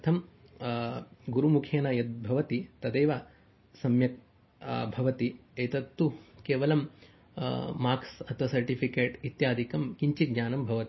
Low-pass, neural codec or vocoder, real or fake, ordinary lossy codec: 7.2 kHz; none; real; MP3, 24 kbps